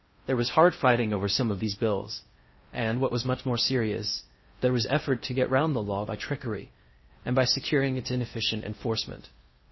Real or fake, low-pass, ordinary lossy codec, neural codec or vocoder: fake; 7.2 kHz; MP3, 24 kbps; codec, 16 kHz in and 24 kHz out, 0.6 kbps, FocalCodec, streaming, 4096 codes